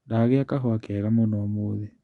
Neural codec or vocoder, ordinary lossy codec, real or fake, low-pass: none; Opus, 64 kbps; real; 10.8 kHz